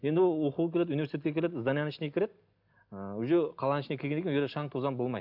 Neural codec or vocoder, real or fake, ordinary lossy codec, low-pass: none; real; none; 5.4 kHz